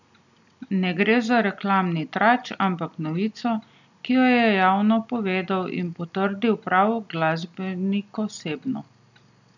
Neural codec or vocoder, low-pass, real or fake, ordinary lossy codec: none; 7.2 kHz; real; none